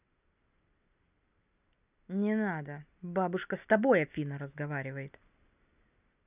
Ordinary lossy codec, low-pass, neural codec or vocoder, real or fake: none; 3.6 kHz; none; real